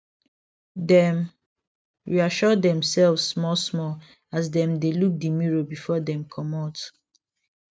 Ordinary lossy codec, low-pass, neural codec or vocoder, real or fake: none; none; none; real